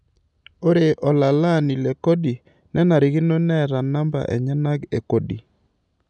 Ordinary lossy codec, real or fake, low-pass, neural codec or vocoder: none; real; 10.8 kHz; none